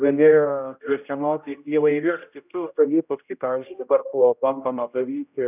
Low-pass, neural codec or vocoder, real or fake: 3.6 kHz; codec, 16 kHz, 0.5 kbps, X-Codec, HuBERT features, trained on general audio; fake